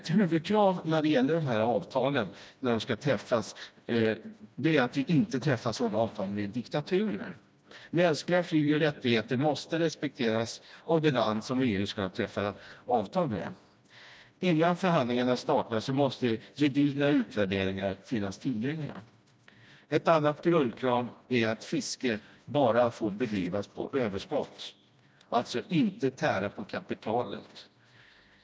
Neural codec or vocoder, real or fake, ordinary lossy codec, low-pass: codec, 16 kHz, 1 kbps, FreqCodec, smaller model; fake; none; none